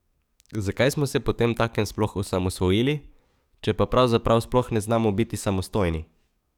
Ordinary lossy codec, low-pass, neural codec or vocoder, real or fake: none; 19.8 kHz; codec, 44.1 kHz, 7.8 kbps, DAC; fake